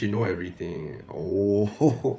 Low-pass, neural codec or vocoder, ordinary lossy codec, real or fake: none; codec, 16 kHz, 8 kbps, FreqCodec, larger model; none; fake